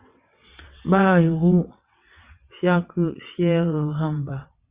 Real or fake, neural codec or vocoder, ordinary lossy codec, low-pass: fake; vocoder, 22.05 kHz, 80 mel bands, WaveNeXt; Opus, 64 kbps; 3.6 kHz